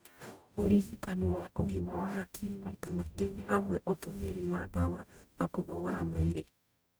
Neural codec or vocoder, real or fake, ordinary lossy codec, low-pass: codec, 44.1 kHz, 0.9 kbps, DAC; fake; none; none